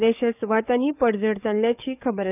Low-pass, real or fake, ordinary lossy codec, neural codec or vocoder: 3.6 kHz; fake; none; codec, 44.1 kHz, 7.8 kbps, DAC